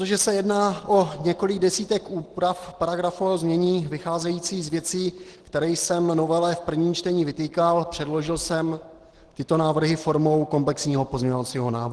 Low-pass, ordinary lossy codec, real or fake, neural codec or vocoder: 10.8 kHz; Opus, 16 kbps; real; none